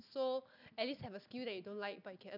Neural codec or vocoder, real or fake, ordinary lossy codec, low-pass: none; real; none; 5.4 kHz